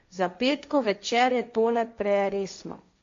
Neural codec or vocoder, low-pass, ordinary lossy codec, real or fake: codec, 16 kHz, 1.1 kbps, Voila-Tokenizer; 7.2 kHz; MP3, 48 kbps; fake